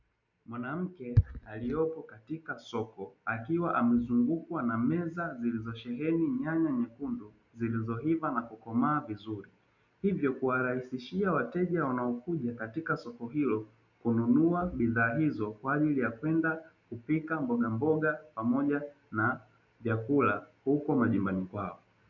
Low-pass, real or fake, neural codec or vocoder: 7.2 kHz; real; none